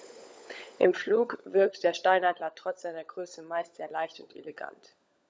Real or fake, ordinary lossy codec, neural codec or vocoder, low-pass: fake; none; codec, 16 kHz, 16 kbps, FunCodec, trained on LibriTTS, 50 frames a second; none